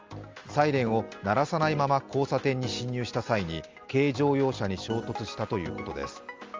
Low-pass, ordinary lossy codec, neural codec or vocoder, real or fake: 7.2 kHz; Opus, 32 kbps; none; real